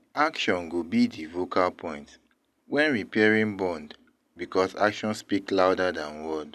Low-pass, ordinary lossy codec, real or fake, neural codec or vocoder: 14.4 kHz; none; real; none